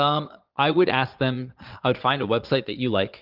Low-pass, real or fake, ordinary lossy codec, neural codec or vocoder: 5.4 kHz; fake; Opus, 32 kbps; vocoder, 22.05 kHz, 80 mel bands, Vocos